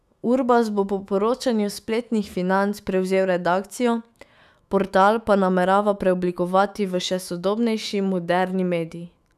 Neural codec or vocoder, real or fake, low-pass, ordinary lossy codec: autoencoder, 48 kHz, 128 numbers a frame, DAC-VAE, trained on Japanese speech; fake; 14.4 kHz; none